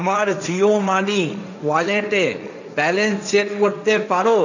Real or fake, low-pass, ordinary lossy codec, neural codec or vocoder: fake; 7.2 kHz; none; codec, 16 kHz, 1.1 kbps, Voila-Tokenizer